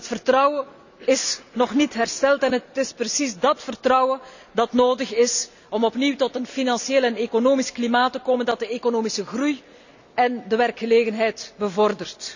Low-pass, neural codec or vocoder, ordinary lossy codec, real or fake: 7.2 kHz; none; none; real